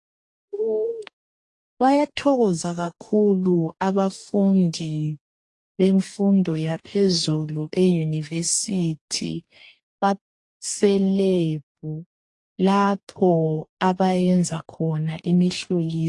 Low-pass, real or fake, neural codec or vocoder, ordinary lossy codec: 10.8 kHz; fake; codec, 44.1 kHz, 2.6 kbps, DAC; AAC, 48 kbps